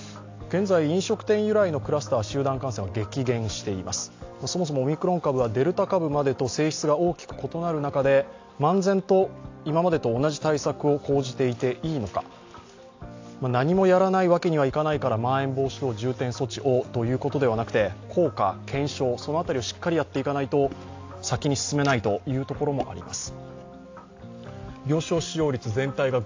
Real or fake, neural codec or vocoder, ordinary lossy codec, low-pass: real; none; AAC, 48 kbps; 7.2 kHz